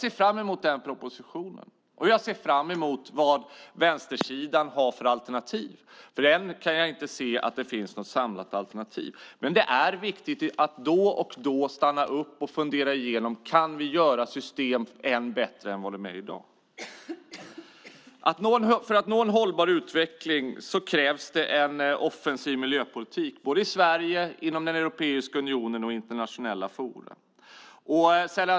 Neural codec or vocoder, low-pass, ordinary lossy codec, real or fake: none; none; none; real